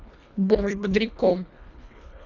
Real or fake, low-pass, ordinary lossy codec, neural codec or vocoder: fake; 7.2 kHz; none; codec, 24 kHz, 1.5 kbps, HILCodec